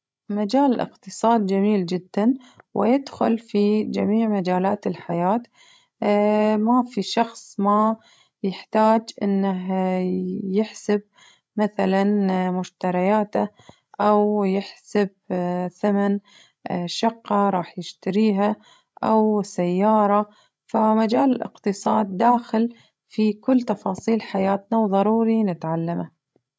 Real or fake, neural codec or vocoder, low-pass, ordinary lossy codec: fake; codec, 16 kHz, 16 kbps, FreqCodec, larger model; none; none